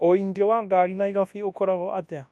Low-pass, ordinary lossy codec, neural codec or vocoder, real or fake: none; none; codec, 24 kHz, 0.9 kbps, WavTokenizer, large speech release; fake